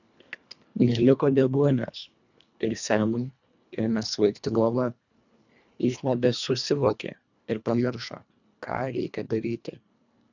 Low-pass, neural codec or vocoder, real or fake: 7.2 kHz; codec, 24 kHz, 1.5 kbps, HILCodec; fake